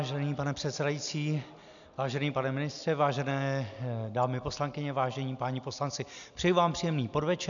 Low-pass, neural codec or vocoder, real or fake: 7.2 kHz; none; real